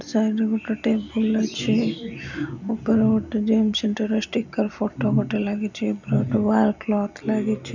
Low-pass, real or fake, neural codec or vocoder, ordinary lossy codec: 7.2 kHz; real; none; none